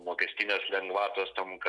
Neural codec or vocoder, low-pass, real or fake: none; 10.8 kHz; real